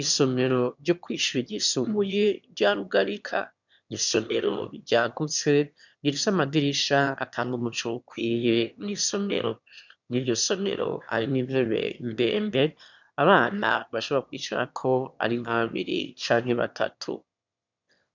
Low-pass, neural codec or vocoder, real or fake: 7.2 kHz; autoencoder, 22.05 kHz, a latent of 192 numbers a frame, VITS, trained on one speaker; fake